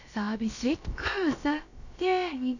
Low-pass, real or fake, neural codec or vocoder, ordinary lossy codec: 7.2 kHz; fake; codec, 16 kHz, about 1 kbps, DyCAST, with the encoder's durations; none